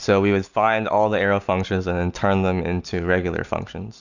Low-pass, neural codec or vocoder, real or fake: 7.2 kHz; none; real